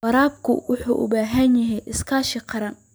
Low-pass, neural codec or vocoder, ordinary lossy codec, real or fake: none; none; none; real